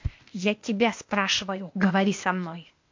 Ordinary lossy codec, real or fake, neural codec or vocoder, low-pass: MP3, 48 kbps; fake; codec, 16 kHz, 0.8 kbps, ZipCodec; 7.2 kHz